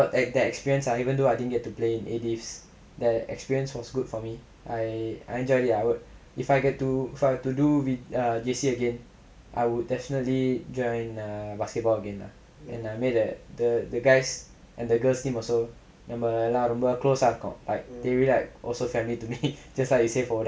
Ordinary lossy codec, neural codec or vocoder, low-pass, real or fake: none; none; none; real